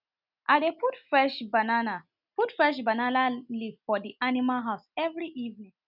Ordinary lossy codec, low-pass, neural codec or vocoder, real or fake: none; 5.4 kHz; none; real